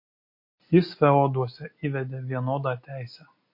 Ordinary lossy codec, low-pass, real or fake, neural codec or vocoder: MP3, 32 kbps; 5.4 kHz; real; none